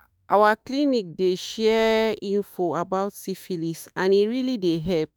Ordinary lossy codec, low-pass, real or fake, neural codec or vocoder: none; none; fake; autoencoder, 48 kHz, 32 numbers a frame, DAC-VAE, trained on Japanese speech